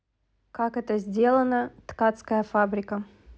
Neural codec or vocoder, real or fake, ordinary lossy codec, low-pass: none; real; none; none